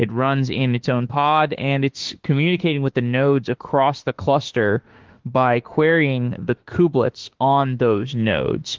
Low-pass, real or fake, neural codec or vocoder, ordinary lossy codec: 7.2 kHz; fake; autoencoder, 48 kHz, 32 numbers a frame, DAC-VAE, trained on Japanese speech; Opus, 16 kbps